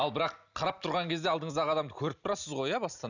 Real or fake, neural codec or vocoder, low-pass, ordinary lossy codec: real; none; 7.2 kHz; none